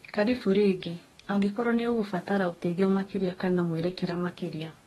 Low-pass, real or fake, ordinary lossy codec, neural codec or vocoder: 19.8 kHz; fake; AAC, 32 kbps; codec, 44.1 kHz, 2.6 kbps, DAC